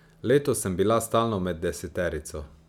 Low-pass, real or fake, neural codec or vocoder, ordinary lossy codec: 19.8 kHz; real; none; none